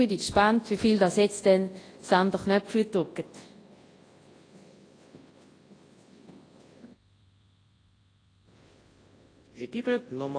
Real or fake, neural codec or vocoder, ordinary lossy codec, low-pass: fake; codec, 24 kHz, 0.9 kbps, WavTokenizer, large speech release; AAC, 32 kbps; 9.9 kHz